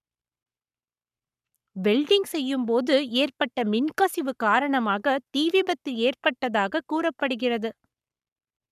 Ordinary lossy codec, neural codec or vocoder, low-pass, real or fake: none; codec, 44.1 kHz, 7.8 kbps, Pupu-Codec; 14.4 kHz; fake